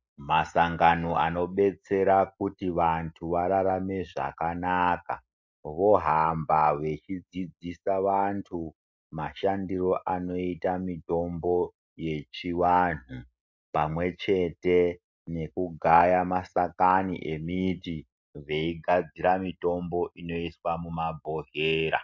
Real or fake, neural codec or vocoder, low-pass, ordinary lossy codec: real; none; 7.2 kHz; MP3, 48 kbps